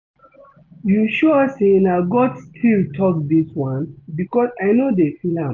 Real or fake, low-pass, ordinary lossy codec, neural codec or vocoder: real; 7.2 kHz; none; none